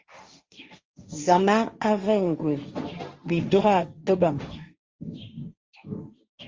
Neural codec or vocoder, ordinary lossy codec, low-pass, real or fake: codec, 16 kHz, 1.1 kbps, Voila-Tokenizer; Opus, 32 kbps; 7.2 kHz; fake